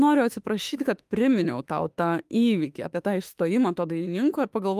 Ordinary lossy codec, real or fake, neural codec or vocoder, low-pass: Opus, 32 kbps; fake; autoencoder, 48 kHz, 32 numbers a frame, DAC-VAE, trained on Japanese speech; 14.4 kHz